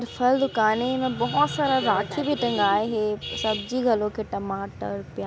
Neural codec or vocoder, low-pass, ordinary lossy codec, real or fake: none; none; none; real